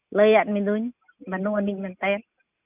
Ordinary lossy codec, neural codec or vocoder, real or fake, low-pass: none; none; real; 3.6 kHz